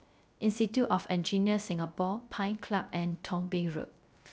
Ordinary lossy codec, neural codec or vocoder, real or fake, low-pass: none; codec, 16 kHz, 0.3 kbps, FocalCodec; fake; none